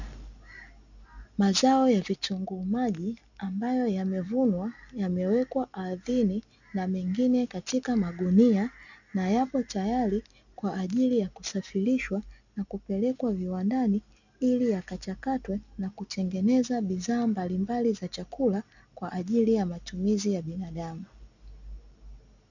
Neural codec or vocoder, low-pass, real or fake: none; 7.2 kHz; real